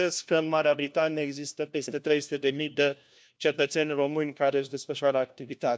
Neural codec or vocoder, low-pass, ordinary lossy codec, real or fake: codec, 16 kHz, 1 kbps, FunCodec, trained on LibriTTS, 50 frames a second; none; none; fake